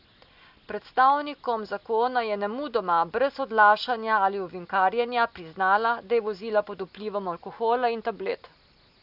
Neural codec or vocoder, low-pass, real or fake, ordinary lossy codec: none; 5.4 kHz; real; none